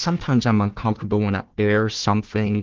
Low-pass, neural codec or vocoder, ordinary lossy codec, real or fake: 7.2 kHz; codec, 16 kHz, 1 kbps, FunCodec, trained on Chinese and English, 50 frames a second; Opus, 16 kbps; fake